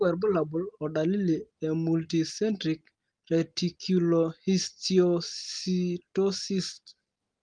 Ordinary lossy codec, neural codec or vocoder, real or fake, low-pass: Opus, 24 kbps; none; real; 9.9 kHz